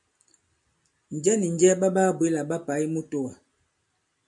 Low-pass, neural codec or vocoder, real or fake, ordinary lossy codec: 10.8 kHz; none; real; MP3, 64 kbps